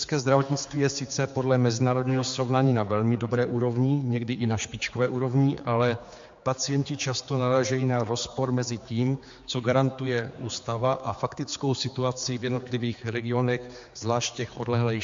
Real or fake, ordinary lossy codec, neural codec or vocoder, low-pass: fake; MP3, 48 kbps; codec, 16 kHz, 4 kbps, X-Codec, HuBERT features, trained on general audio; 7.2 kHz